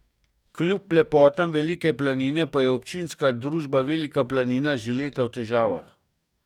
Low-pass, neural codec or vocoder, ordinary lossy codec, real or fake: 19.8 kHz; codec, 44.1 kHz, 2.6 kbps, DAC; none; fake